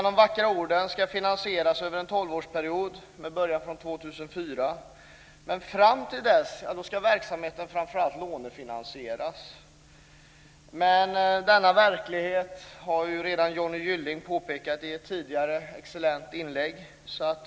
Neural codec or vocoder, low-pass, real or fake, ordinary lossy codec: none; none; real; none